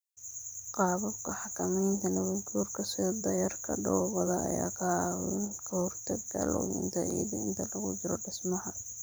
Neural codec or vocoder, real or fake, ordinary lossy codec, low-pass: vocoder, 44.1 kHz, 128 mel bands every 512 samples, BigVGAN v2; fake; none; none